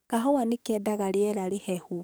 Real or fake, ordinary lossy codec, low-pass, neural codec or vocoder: fake; none; none; codec, 44.1 kHz, 7.8 kbps, DAC